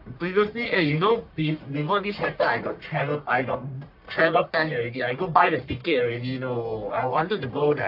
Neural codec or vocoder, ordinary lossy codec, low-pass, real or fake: codec, 44.1 kHz, 1.7 kbps, Pupu-Codec; AAC, 48 kbps; 5.4 kHz; fake